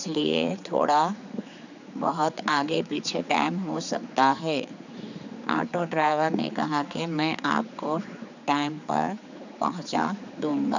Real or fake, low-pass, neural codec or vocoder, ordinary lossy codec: fake; 7.2 kHz; codec, 16 kHz, 4 kbps, X-Codec, HuBERT features, trained on general audio; none